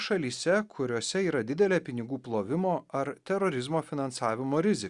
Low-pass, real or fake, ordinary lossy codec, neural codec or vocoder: 10.8 kHz; real; Opus, 64 kbps; none